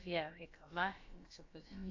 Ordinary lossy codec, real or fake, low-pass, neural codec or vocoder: AAC, 48 kbps; fake; 7.2 kHz; codec, 16 kHz, about 1 kbps, DyCAST, with the encoder's durations